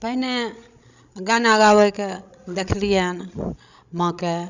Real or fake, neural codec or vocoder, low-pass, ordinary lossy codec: fake; codec, 16 kHz, 16 kbps, FreqCodec, larger model; 7.2 kHz; none